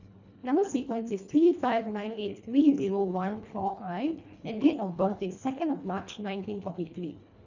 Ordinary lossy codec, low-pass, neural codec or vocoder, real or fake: none; 7.2 kHz; codec, 24 kHz, 1.5 kbps, HILCodec; fake